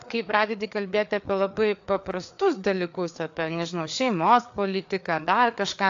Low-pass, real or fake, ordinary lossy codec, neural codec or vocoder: 7.2 kHz; fake; AAC, 64 kbps; codec, 16 kHz, 4 kbps, FreqCodec, larger model